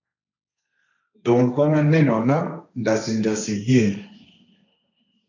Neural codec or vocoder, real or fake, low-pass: codec, 16 kHz, 1.1 kbps, Voila-Tokenizer; fake; 7.2 kHz